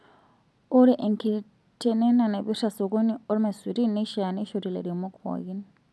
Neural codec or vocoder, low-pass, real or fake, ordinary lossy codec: none; none; real; none